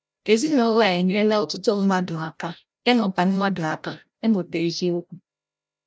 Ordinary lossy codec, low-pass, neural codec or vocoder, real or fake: none; none; codec, 16 kHz, 0.5 kbps, FreqCodec, larger model; fake